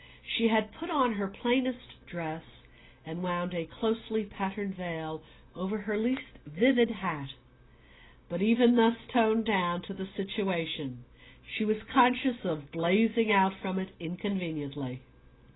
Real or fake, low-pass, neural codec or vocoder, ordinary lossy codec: real; 7.2 kHz; none; AAC, 16 kbps